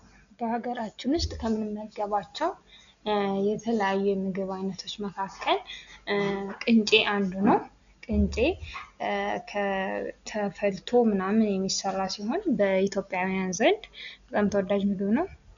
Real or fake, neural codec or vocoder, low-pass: real; none; 7.2 kHz